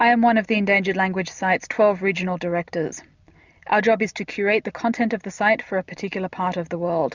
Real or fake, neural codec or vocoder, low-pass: real; none; 7.2 kHz